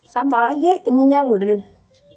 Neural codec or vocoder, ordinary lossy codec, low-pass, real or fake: codec, 24 kHz, 0.9 kbps, WavTokenizer, medium music audio release; none; none; fake